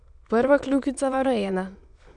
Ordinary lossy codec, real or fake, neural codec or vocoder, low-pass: none; fake; autoencoder, 22.05 kHz, a latent of 192 numbers a frame, VITS, trained on many speakers; 9.9 kHz